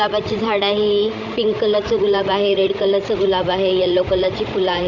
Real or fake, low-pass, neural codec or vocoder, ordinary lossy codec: fake; 7.2 kHz; codec, 16 kHz, 16 kbps, FreqCodec, larger model; none